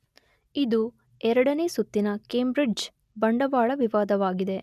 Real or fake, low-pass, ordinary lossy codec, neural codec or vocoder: real; 14.4 kHz; none; none